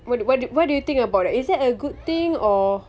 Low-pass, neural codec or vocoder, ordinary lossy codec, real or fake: none; none; none; real